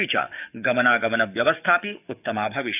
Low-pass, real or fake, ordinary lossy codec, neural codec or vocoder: 3.6 kHz; fake; none; codec, 44.1 kHz, 7.8 kbps, DAC